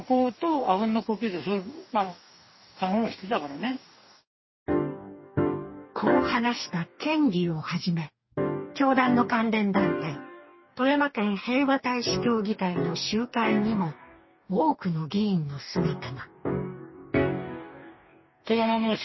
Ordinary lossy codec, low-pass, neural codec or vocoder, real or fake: MP3, 24 kbps; 7.2 kHz; codec, 44.1 kHz, 2.6 kbps, DAC; fake